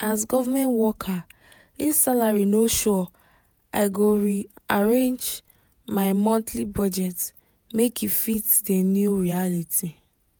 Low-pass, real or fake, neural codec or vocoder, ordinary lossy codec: none; fake; vocoder, 48 kHz, 128 mel bands, Vocos; none